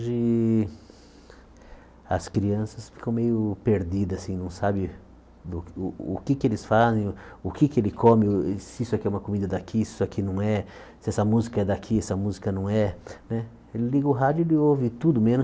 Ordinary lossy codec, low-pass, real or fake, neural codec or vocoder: none; none; real; none